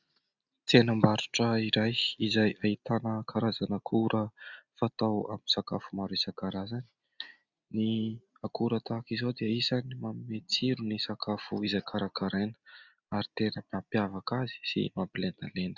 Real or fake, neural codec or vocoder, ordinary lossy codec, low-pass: real; none; Opus, 64 kbps; 7.2 kHz